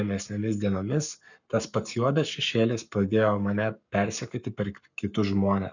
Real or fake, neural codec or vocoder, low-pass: fake; codec, 44.1 kHz, 7.8 kbps, Pupu-Codec; 7.2 kHz